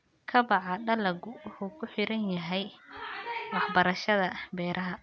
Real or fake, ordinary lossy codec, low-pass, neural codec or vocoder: real; none; none; none